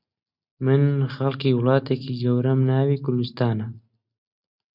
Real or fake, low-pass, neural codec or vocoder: real; 5.4 kHz; none